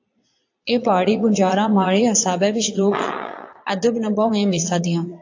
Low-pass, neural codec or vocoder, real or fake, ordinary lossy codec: 7.2 kHz; vocoder, 22.05 kHz, 80 mel bands, Vocos; fake; AAC, 48 kbps